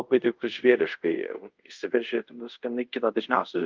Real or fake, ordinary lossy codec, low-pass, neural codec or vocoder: fake; Opus, 24 kbps; 7.2 kHz; codec, 24 kHz, 0.5 kbps, DualCodec